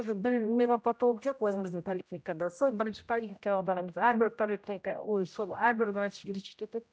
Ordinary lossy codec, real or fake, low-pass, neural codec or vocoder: none; fake; none; codec, 16 kHz, 0.5 kbps, X-Codec, HuBERT features, trained on general audio